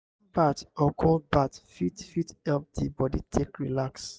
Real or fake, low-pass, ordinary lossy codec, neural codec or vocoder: real; none; none; none